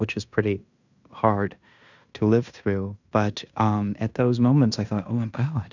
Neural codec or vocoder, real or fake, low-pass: codec, 16 kHz in and 24 kHz out, 0.9 kbps, LongCat-Audio-Codec, fine tuned four codebook decoder; fake; 7.2 kHz